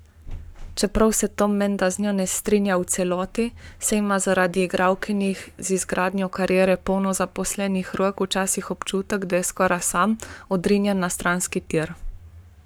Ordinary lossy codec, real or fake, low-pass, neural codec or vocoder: none; fake; none; codec, 44.1 kHz, 7.8 kbps, Pupu-Codec